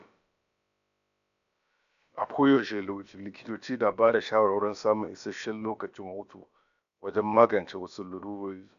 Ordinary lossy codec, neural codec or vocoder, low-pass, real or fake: none; codec, 16 kHz, about 1 kbps, DyCAST, with the encoder's durations; 7.2 kHz; fake